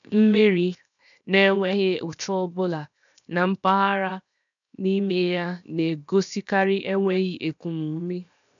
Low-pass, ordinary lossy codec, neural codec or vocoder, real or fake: 7.2 kHz; none; codec, 16 kHz, 0.7 kbps, FocalCodec; fake